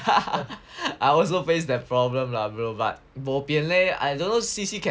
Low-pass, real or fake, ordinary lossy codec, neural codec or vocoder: none; real; none; none